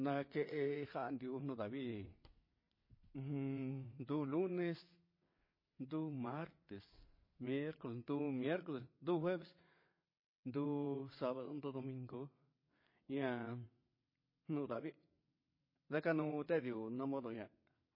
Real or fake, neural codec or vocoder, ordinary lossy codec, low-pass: fake; vocoder, 22.05 kHz, 80 mel bands, WaveNeXt; MP3, 24 kbps; 5.4 kHz